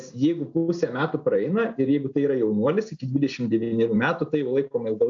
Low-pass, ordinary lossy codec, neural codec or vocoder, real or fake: 7.2 kHz; AAC, 64 kbps; none; real